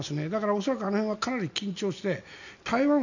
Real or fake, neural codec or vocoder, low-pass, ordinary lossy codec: real; none; 7.2 kHz; none